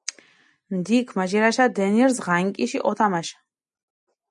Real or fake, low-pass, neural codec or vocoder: real; 10.8 kHz; none